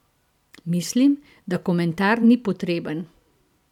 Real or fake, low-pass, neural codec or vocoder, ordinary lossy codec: fake; 19.8 kHz; vocoder, 44.1 kHz, 128 mel bands every 512 samples, BigVGAN v2; none